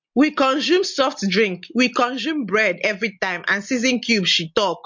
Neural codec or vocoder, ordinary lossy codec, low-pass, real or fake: none; MP3, 48 kbps; 7.2 kHz; real